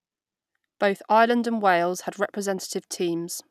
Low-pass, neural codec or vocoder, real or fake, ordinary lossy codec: 14.4 kHz; none; real; none